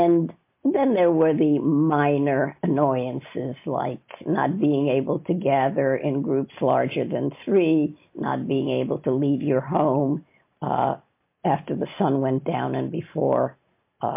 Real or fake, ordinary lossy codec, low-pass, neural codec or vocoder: real; MP3, 24 kbps; 3.6 kHz; none